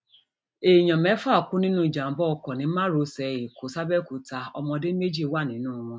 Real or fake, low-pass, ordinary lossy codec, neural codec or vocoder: real; none; none; none